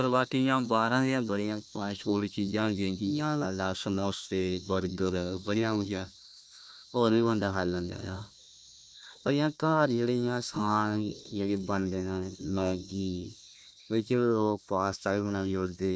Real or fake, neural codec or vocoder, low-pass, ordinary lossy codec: fake; codec, 16 kHz, 1 kbps, FunCodec, trained on Chinese and English, 50 frames a second; none; none